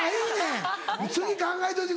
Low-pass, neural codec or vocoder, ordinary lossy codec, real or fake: none; none; none; real